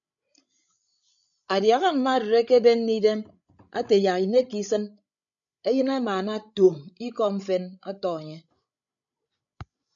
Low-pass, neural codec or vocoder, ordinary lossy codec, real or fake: 7.2 kHz; codec, 16 kHz, 16 kbps, FreqCodec, larger model; MP3, 96 kbps; fake